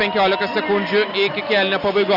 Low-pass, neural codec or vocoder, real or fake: 5.4 kHz; none; real